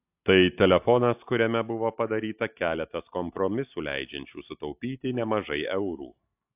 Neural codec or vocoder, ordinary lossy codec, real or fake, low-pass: none; AAC, 32 kbps; real; 3.6 kHz